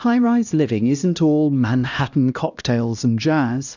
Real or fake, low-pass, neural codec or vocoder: fake; 7.2 kHz; codec, 16 kHz, 1 kbps, X-Codec, HuBERT features, trained on LibriSpeech